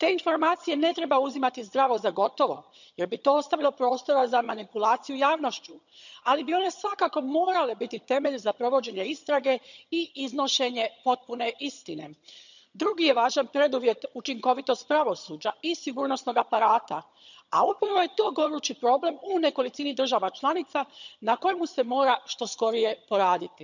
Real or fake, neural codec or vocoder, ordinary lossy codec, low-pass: fake; vocoder, 22.05 kHz, 80 mel bands, HiFi-GAN; none; 7.2 kHz